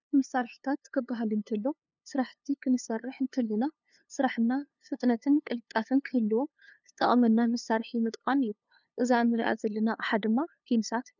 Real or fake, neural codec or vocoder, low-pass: fake; codec, 16 kHz, 2 kbps, FunCodec, trained on LibriTTS, 25 frames a second; 7.2 kHz